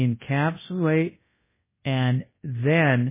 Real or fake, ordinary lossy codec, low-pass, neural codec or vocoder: fake; MP3, 16 kbps; 3.6 kHz; codec, 24 kHz, 0.9 kbps, WavTokenizer, large speech release